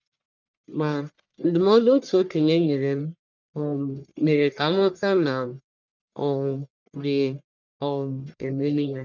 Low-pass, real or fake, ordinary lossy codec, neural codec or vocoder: 7.2 kHz; fake; none; codec, 44.1 kHz, 1.7 kbps, Pupu-Codec